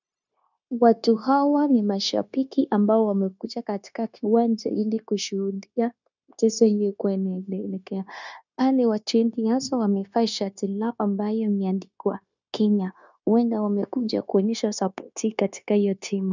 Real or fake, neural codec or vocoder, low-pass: fake; codec, 16 kHz, 0.9 kbps, LongCat-Audio-Codec; 7.2 kHz